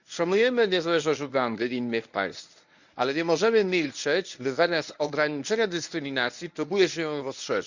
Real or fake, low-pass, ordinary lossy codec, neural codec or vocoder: fake; 7.2 kHz; none; codec, 24 kHz, 0.9 kbps, WavTokenizer, medium speech release version 1